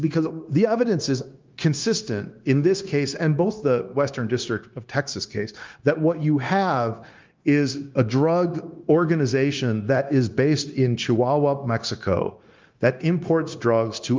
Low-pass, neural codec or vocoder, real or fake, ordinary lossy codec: 7.2 kHz; codec, 16 kHz, 0.9 kbps, LongCat-Audio-Codec; fake; Opus, 32 kbps